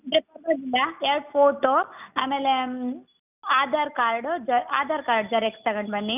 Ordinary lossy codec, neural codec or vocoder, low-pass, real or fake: none; none; 3.6 kHz; real